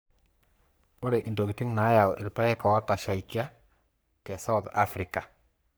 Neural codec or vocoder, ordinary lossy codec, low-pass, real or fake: codec, 44.1 kHz, 3.4 kbps, Pupu-Codec; none; none; fake